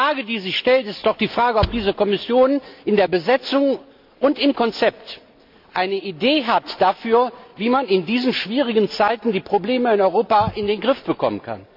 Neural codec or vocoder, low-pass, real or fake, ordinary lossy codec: none; 5.4 kHz; real; MP3, 48 kbps